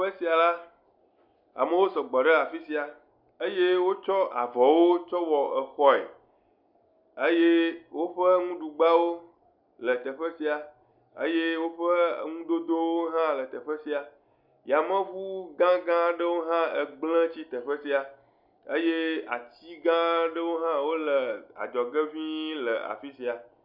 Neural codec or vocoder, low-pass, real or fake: none; 5.4 kHz; real